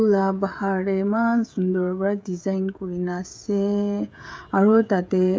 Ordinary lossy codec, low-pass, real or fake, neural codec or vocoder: none; none; fake; codec, 16 kHz, 16 kbps, FreqCodec, smaller model